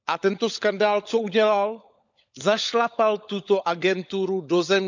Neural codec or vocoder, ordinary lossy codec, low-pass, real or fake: codec, 16 kHz, 16 kbps, FunCodec, trained on LibriTTS, 50 frames a second; none; 7.2 kHz; fake